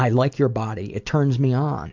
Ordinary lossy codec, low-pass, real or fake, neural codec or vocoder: MP3, 64 kbps; 7.2 kHz; real; none